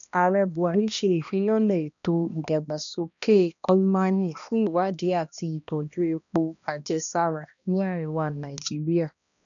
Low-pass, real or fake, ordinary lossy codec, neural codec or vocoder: 7.2 kHz; fake; none; codec, 16 kHz, 1 kbps, X-Codec, HuBERT features, trained on balanced general audio